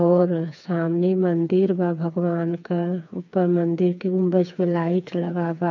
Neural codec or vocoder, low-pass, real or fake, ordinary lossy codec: codec, 16 kHz, 4 kbps, FreqCodec, smaller model; 7.2 kHz; fake; none